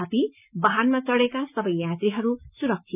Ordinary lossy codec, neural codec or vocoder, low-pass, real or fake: none; none; 3.6 kHz; real